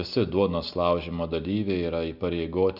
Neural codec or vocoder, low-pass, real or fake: none; 5.4 kHz; real